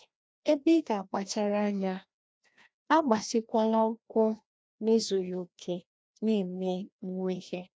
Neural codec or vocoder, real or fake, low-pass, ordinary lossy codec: codec, 16 kHz, 1 kbps, FreqCodec, larger model; fake; none; none